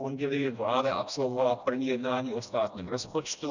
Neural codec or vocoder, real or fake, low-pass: codec, 16 kHz, 1 kbps, FreqCodec, smaller model; fake; 7.2 kHz